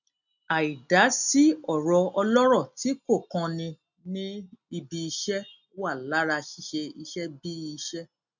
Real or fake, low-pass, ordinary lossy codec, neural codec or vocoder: real; 7.2 kHz; none; none